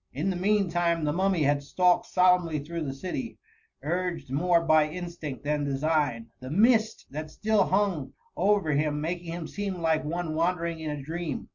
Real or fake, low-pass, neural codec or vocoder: real; 7.2 kHz; none